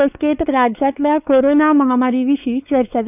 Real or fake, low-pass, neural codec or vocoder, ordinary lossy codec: fake; 3.6 kHz; codec, 16 kHz, 4 kbps, X-Codec, WavLM features, trained on Multilingual LibriSpeech; none